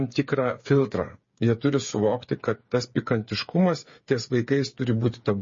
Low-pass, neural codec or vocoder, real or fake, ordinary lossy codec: 7.2 kHz; codec, 16 kHz, 8 kbps, FreqCodec, smaller model; fake; MP3, 32 kbps